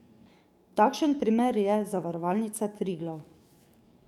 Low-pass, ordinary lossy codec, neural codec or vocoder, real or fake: 19.8 kHz; none; codec, 44.1 kHz, 7.8 kbps, DAC; fake